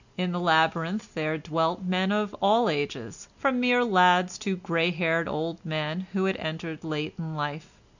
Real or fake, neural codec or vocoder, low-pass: real; none; 7.2 kHz